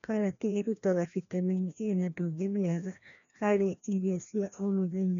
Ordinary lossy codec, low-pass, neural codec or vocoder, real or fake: none; 7.2 kHz; codec, 16 kHz, 1 kbps, FreqCodec, larger model; fake